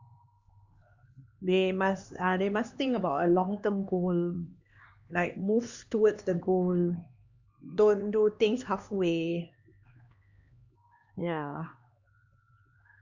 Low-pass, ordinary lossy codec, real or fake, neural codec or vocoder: 7.2 kHz; Opus, 64 kbps; fake; codec, 16 kHz, 2 kbps, X-Codec, HuBERT features, trained on LibriSpeech